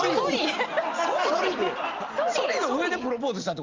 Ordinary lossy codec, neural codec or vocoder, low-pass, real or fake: Opus, 24 kbps; none; 7.2 kHz; real